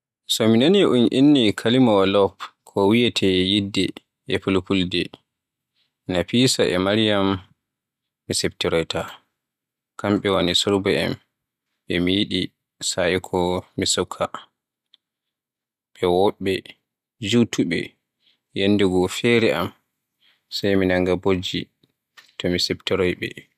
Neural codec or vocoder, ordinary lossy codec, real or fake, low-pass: none; none; real; 14.4 kHz